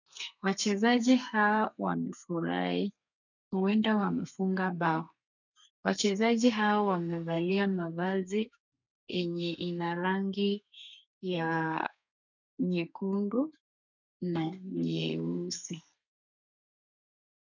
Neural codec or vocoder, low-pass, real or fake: codec, 32 kHz, 1.9 kbps, SNAC; 7.2 kHz; fake